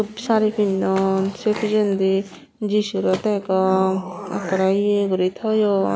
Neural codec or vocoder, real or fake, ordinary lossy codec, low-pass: none; real; none; none